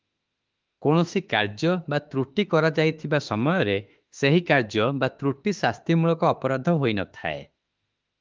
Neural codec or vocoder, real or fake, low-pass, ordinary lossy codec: autoencoder, 48 kHz, 32 numbers a frame, DAC-VAE, trained on Japanese speech; fake; 7.2 kHz; Opus, 32 kbps